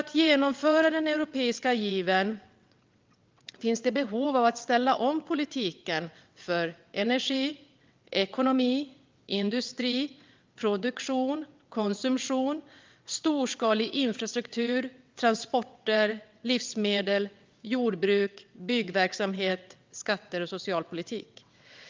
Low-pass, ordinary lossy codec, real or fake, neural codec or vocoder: 7.2 kHz; Opus, 24 kbps; fake; vocoder, 22.05 kHz, 80 mel bands, WaveNeXt